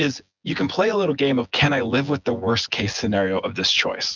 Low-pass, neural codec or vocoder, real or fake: 7.2 kHz; vocoder, 24 kHz, 100 mel bands, Vocos; fake